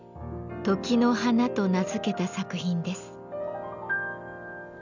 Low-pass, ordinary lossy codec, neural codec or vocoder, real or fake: 7.2 kHz; none; none; real